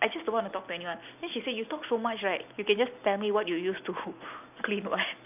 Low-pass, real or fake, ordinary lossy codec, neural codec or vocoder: 3.6 kHz; real; none; none